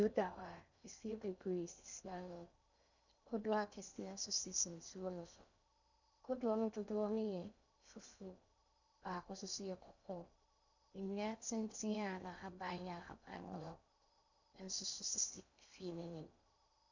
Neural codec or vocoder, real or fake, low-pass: codec, 16 kHz in and 24 kHz out, 0.8 kbps, FocalCodec, streaming, 65536 codes; fake; 7.2 kHz